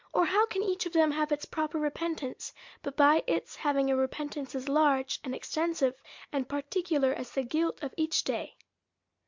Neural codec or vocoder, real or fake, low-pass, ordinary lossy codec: none; real; 7.2 kHz; MP3, 64 kbps